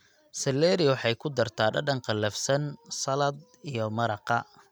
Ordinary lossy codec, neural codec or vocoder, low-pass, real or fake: none; none; none; real